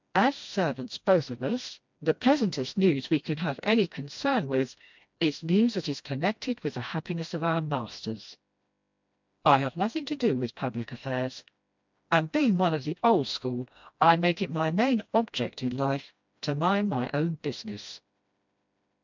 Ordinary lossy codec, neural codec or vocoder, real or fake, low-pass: MP3, 64 kbps; codec, 16 kHz, 1 kbps, FreqCodec, smaller model; fake; 7.2 kHz